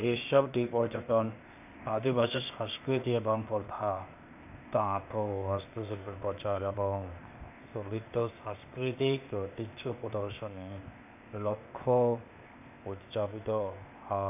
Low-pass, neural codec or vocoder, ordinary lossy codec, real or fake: 3.6 kHz; codec, 16 kHz, 0.8 kbps, ZipCodec; none; fake